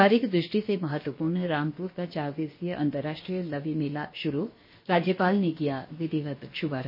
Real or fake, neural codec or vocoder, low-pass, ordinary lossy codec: fake; codec, 16 kHz, about 1 kbps, DyCAST, with the encoder's durations; 5.4 kHz; MP3, 24 kbps